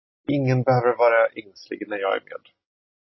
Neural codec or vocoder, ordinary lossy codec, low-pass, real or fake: none; MP3, 24 kbps; 7.2 kHz; real